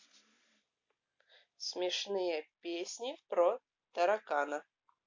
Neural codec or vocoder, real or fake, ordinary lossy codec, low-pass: none; real; MP3, 48 kbps; 7.2 kHz